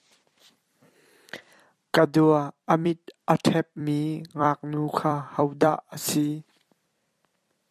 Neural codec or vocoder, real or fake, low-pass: vocoder, 44.1 kHz, 128 mel bands every 256 samples, BigVGAN v2; fake; 14.4 kHz